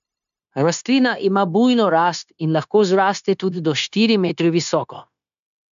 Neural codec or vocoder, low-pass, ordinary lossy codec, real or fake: codec, 16 kHz, 0.9 kbps, LongCat-Audio-Codec; 7.2 kHz; AAC, 96 kbps; fake